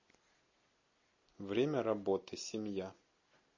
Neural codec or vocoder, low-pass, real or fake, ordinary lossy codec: none; 7.2 kHz; real; MP3, 32 kbps